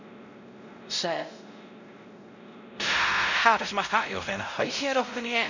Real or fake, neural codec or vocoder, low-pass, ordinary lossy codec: fake; codec, 16 kHz, 0.5 kbps, X-Codec, WavLM features, trained on Multilingual LibriSpeech; 7.2 kHz; none